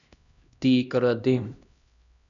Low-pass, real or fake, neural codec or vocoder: 7.2 kHz; fake; codec, 16 kHz, 1 kbps, X-Codec, HuBERT features, trained on LibriSpeech